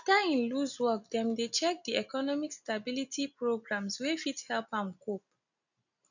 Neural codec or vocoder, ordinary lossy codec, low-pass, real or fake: none; none; 7.2 kHz; real